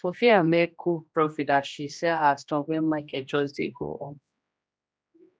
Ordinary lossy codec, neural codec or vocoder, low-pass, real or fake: none; codec, 16 kHz, 1 kbps, X-Codec, HuBERT features, trained on general audio; none; fake